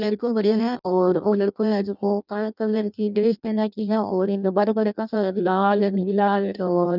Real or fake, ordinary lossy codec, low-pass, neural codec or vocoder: fake; none; 5.4 kHz; codec, 16 kHz in and 24 kHz out, 0.6 kbps, FireRedTTS-2 codec